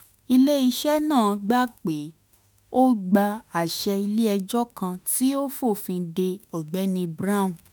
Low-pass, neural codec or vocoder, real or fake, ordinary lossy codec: none; autoencoder, 48 kHz, 32 numbers a frame, DAC-VAE, trained on Japanese speech; fake; none